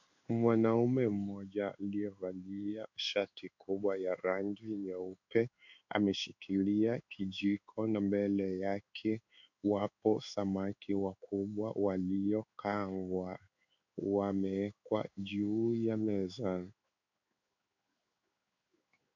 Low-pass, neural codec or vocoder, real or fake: 7.2 kHz; codec, 16 kHz in and 24 kHz out, 1 kbps, XY-Tokenizer; fake